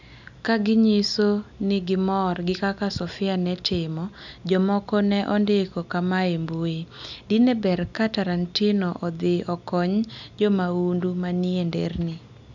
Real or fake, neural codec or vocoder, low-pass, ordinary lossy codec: real; none; 7.2 kHz; none